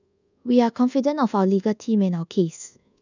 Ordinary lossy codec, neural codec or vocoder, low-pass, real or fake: none; codec, 24 kHz, 1.2 kbps, DualCodec; 7.2 kHz; fake